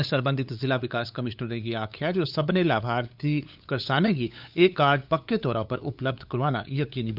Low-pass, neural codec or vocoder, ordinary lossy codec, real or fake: 5.4 kHz; codec, 16 kHz, 8 kbps, FunCodec, trained on LibriTTS, 25 frames a second; none; fake